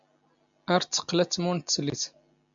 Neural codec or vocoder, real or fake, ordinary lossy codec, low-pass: none; real; MP3, 64 kbps; 7.2 kHz